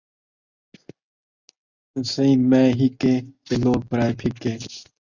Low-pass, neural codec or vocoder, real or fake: 7.2 kHz; none; real